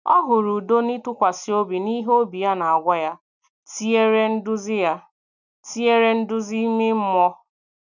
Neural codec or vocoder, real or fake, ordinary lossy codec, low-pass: none; real; none; 7.2 kHz